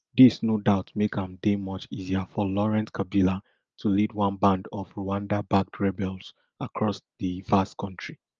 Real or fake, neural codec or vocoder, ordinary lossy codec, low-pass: real; none; Opus, 24 kbps; 7.2 kHz